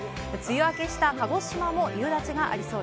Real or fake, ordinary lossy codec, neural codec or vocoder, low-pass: real; none; none; none